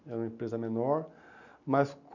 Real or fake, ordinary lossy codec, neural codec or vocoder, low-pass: real; none; none; 7.2 kHz